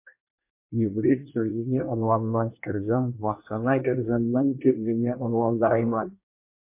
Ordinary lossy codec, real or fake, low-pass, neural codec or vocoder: MP3, 24 kbps; fake; 3.6 kHz; codec, 24 kHz, 1 kbps, SNAC